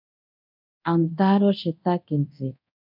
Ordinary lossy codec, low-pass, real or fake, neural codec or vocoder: MP3, 48 kbps; 5.4 kHz; fake; codec, 24 kHz, 0.9 kbps, DualCodec